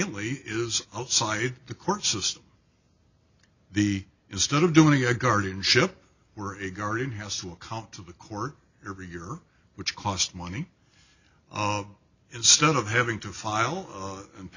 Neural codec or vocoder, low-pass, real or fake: none; 7.2 kHz; real